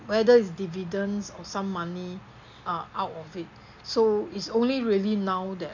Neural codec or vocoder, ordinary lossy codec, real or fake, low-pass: none; none; real; 7.2 kHz